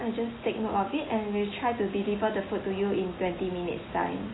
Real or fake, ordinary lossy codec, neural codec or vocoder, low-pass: real; AAC, 16 kbps; none; 7.2 kHz